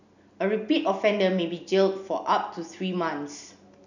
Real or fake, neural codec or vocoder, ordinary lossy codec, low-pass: real; none; none; 7.2 kHz